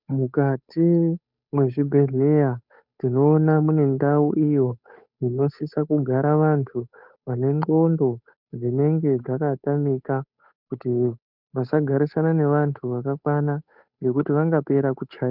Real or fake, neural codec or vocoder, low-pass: fake; codec, 16 kHz, 8 kbps, FunCodec, trained on Chinese and English, 25 frames a second; 5.4 kHz